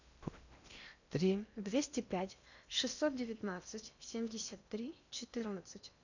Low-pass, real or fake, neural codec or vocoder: 7.2 kHz; fake; codec, 16 kHz in and 24 kHz out, 0.8 kbps, FocalCodec, streaming, 65536 codes